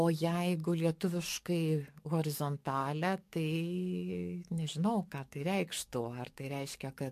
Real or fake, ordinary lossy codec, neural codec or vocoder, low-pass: fake; MP3, 64 kbps; codec, 44.1 kHz, 7.8 kbps, DAC; 14.4 kHz